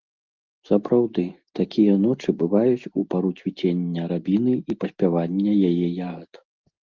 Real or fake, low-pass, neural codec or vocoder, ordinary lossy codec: real; 7.2 kHz; none; Opus, 32 kbps